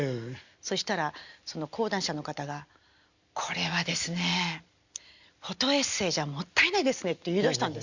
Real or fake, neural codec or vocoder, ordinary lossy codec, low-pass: real; none; Opus, 64 kbps; 7.2 kHz